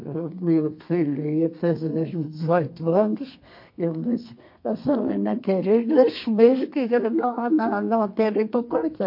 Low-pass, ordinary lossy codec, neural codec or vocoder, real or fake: 5.4 kHz; MP3, 32 kbps; codec, 32 kHz, 1.9 kbps, SNAC; fake